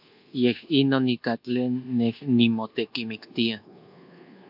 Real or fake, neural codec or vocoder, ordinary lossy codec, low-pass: fake; codec, 24 kHz, 1.2 kbps, DualCodec; AAC, 48 kbps; 5.4 kHz